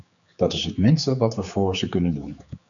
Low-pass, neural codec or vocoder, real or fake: 7.2 kHz; codec, 16 kHz, 4 kbps, X-Codec, HuBERT features, trained on general audio; fake